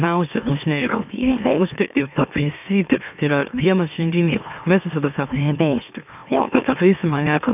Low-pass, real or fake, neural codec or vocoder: 3.6 kHz; fake; autoencoder, 44.1 kHz, a latent of 192 numbers a frame, MeloTTS